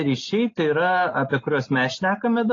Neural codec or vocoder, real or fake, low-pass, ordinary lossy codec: none; real; 7.2 kHz; AAC, 32 kbps